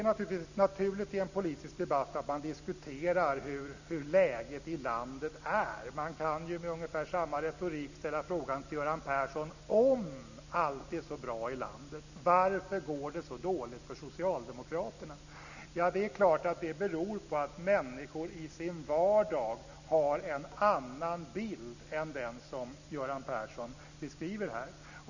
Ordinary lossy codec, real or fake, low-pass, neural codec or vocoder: none; real; 7.2 kHz; none